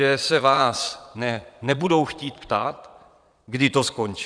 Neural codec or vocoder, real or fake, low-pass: vocoder, 22.05 kHz, 80 mel bands, Vocos; fake; 9.9 kHz